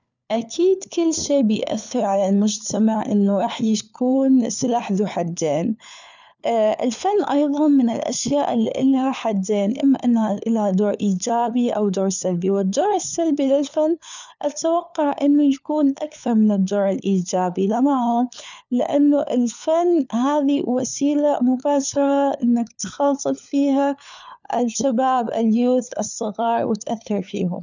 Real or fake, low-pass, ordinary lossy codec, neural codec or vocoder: fake; 7.2 kHz; none; codec, 16 kHz, 4 kbps, FunCodec, trained on LibriTTS, 50 frames a second